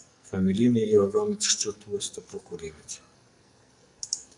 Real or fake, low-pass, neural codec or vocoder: fake; 10.8 kHz; codec, 44.1 kHz, 2.6 kbps, SNAC